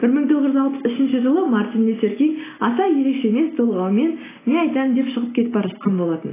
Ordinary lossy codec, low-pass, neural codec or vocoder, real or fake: AAC, 16 kbps; 3.6 kHz; none; real